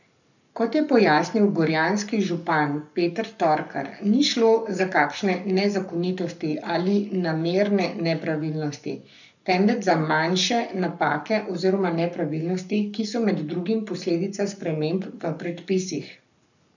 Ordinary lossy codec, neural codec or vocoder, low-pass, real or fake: none; codec, 44.1 kHz, 7.8 kbps, Pupu-Codec; 7.2 kHz; fake